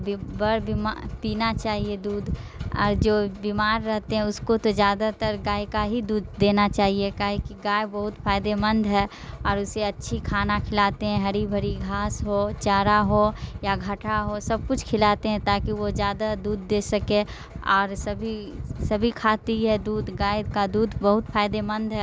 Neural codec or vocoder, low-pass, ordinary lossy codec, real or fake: none; none; none; real